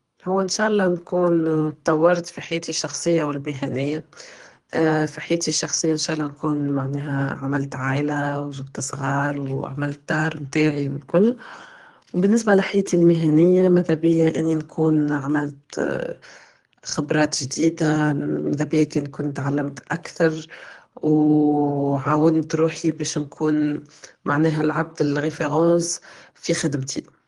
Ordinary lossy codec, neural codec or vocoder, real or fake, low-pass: Opus, 24 kbps; codec, 24 kHz, 3 kbps, HILCodec; fake; 10.8 kHz